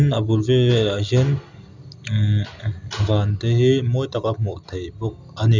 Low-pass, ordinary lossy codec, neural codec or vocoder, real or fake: 7.2 kHz; none; none; real